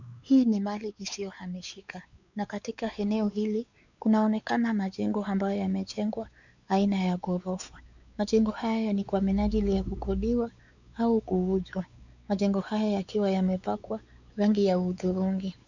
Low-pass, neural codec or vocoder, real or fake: 7.2 kHz; codec, 16 kHz, 4 kbps, X-Codec, WavLM features, trained on Multilingual LibriSpeech; fake